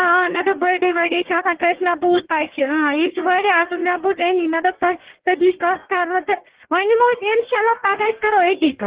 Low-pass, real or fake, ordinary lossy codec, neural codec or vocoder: 3.6 kHz; fake; Opus, 16 kbps; codec, 44.1 kHz, 1.7 kbps, Pupu-Codec